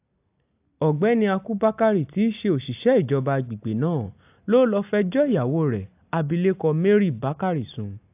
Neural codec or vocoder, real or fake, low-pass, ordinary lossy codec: none; real; 3.6 kHz; AAC, 32 kbps